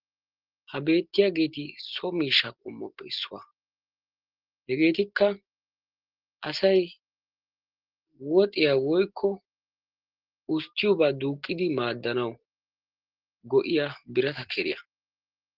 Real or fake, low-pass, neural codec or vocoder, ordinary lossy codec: real; 5.4 kHz; none; Opus, 16 kbps